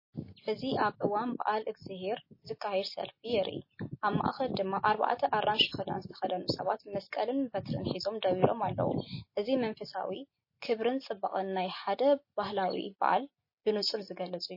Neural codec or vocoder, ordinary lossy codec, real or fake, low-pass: none; MP3, 24 kbps; real; 5.4 kHz